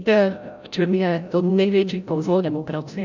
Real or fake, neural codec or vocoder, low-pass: fake; codec, 16 kHz, 0.5 kbps, FreqCodec, larger model; 7.2 kHz